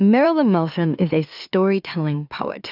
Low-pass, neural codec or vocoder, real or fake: 5.4 kHz; autoencoder, 44.1 kHz, a latent of 192 numbers a frame, MeloTTS; fake